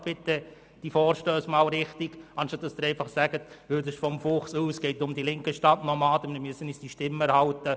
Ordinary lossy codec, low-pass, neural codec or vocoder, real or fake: none; none; none; real